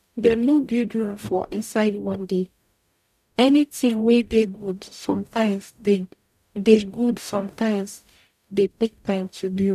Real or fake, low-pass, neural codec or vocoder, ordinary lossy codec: fake; 14.4 kHz; codec, 44.1 kHz, 0.9 kbps, DAC; none